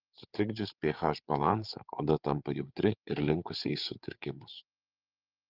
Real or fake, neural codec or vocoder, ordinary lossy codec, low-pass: fake; codec, 16 kHz, 8 kbps, FreqCodec, larger model; Opus, 32 kbps; 5.4 kHz